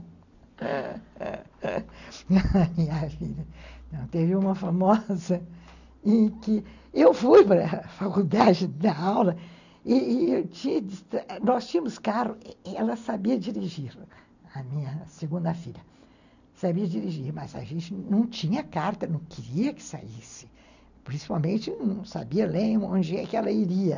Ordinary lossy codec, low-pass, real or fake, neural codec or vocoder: none; 7.2 kHz; real; none